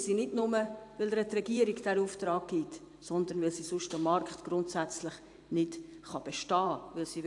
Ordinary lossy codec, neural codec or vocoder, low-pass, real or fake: AAC, 64 kbps; vocoder, 44.1 kHz, 128 mel bands every 512 samples, BigVGAN v2; 10.8 kHz; fake